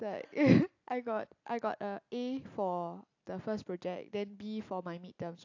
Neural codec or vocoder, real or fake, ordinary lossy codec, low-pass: none; real; AAC, 48 kbps; 7.2 kHz